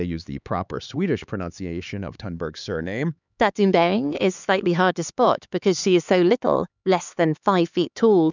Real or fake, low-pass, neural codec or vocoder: fake; 7.2 kHz; codec, 16 kHz, 4 kbps, X-Codec, HuBERT features, trained on LibriSpeech